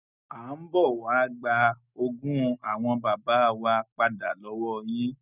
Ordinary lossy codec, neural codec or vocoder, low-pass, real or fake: none; none; 3.6 kHz; real